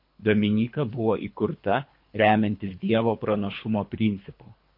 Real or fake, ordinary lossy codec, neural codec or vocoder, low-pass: fake; MP3, 32 kbps; codec, 24 kHz, 3 kbps, HILCodec; 5.4 kHz